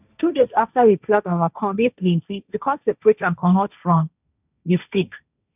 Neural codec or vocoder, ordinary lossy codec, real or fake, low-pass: codec, 16 kHz, 1.1 kbps, Voila-Tokenizer; none; fake; 3.6 kHz